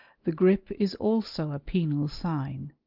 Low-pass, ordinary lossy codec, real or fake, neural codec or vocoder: 5.4 kHz; Opus, 32 kbps; real; none